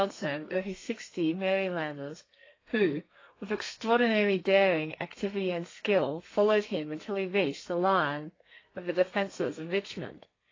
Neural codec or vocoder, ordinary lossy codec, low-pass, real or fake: codec, 32 kHz, 1.9 kbps, SNAC; AAC, 32 kbps; 7.2 kHz; fake